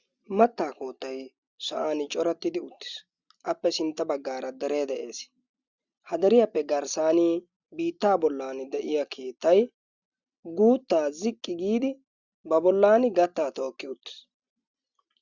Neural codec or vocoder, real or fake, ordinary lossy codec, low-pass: none; real; Opus, 64 kbps; 7.2 kHz